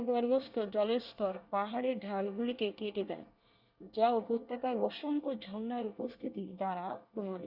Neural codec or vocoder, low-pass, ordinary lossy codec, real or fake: codec, 24 kHz, 1 kbps, SNAC; 5.4 kHz; Opus, 64 kbps; fake